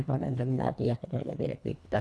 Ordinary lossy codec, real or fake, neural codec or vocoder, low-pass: none; fake; codec, 24 kHz, 1.5 kbps, HILCodec; none